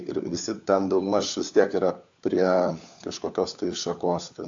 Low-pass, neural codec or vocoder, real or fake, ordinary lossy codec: 7.2 kHz; codec, 16 kHz, 4 kbps, FunCodec, trained on LibriTTS, 50 frames a second; fake; AAC, 64 kbps